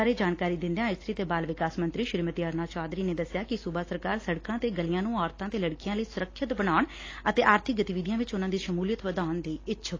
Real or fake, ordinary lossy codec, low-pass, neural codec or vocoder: real; AAC, 32 kbps; 7.2 kHz; none